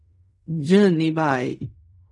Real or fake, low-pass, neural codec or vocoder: fake; 10.8 kHz; codec, 16 kHz in and 24 kHz out, 0.4 kbps, LongCat-Audio-Codec, fine tuned four codebook decoder